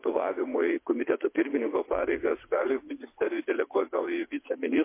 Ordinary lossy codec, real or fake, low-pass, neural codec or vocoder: MP3, 24 kbps; fake; 3.6 kHz; vocoder, 22.05 kHz, 80 mel bands, WaveNeXt